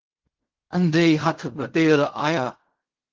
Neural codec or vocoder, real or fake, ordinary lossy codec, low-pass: codec, 16 kHz in and 24 kHz out, 0.4 kbps, LongCat-Audio-Codec, fine tuned four codebook decoder; fake; Opus, 16 kbps; 7.2 kHz